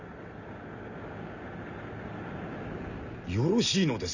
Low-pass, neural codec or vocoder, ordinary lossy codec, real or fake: 7.2 kHz; none; none; real